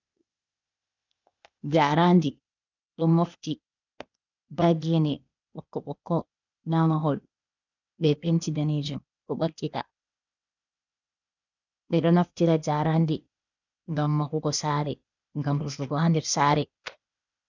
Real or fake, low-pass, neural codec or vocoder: fake; 7.2 kHz; codec, 16 kHz, 0.8 kbps, ZipCodec